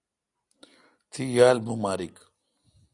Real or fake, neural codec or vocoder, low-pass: real; none; 10.8 kHz